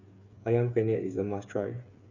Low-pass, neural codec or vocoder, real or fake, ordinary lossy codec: 7.2 kHz; codec, 16 kHz, 8 kbps, FreqCodec, smaller model; fake; none